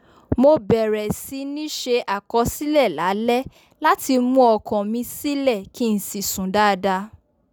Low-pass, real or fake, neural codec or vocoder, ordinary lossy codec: none; real; none; none